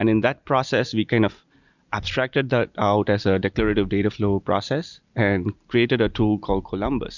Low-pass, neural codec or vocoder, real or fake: 7.2 kHz; none; real